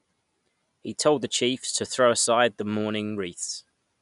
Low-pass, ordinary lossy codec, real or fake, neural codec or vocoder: 10.8 kHz; none; real; none